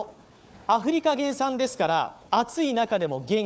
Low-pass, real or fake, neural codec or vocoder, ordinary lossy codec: none; fake; codec, 16 kHz, 4 kbps, FunCodec, trained on Chinese and English, 50 frames a second; none